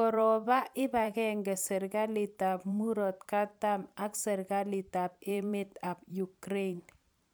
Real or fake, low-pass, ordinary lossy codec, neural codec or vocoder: fake; none; none; vocoder, 44.1 kHz, 128 mel bands every 512 samples, BigVGAN v2